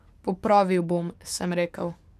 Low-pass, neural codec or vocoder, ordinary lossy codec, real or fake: 14.4 kHz; codec, 44.1 kHz, 7.8 kbps, DAC; none; fake